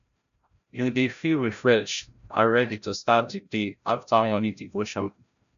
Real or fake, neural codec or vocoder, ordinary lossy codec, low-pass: fake; codec, 16 kHz, 0.5 kbps, FreqCodec, larger model; none; 7.2 kHz